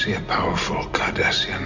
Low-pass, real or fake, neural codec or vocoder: 7.2 kHz; real; none